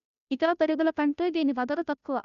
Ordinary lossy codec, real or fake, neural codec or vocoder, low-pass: MP3, 96 kbps; fake; codec, 16 kHz, 0.5 kbps, FunCodec, trained on Chinese and English, 25 frames a second; 7.2 kHz